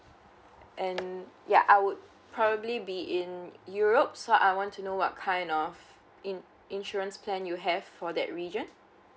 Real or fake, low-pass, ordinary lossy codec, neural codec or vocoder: real; none; none; none